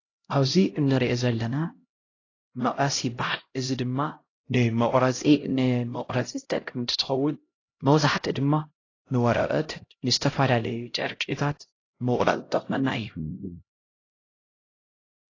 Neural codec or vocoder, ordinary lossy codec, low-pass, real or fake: codec, 16 kHz, 0.5 kbps, X-Codec, HuBERT features, trained on LibriSpeech; AAC, 32 kbps; 7.2 kHz; fake